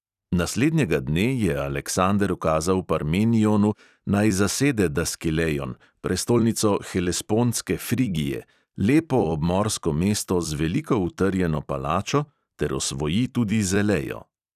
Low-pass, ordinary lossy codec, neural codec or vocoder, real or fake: 14.4 kHz; none; vocoder, 44.1 kHz, 128 mel bands every 256 samples, BigVGAN v2; fake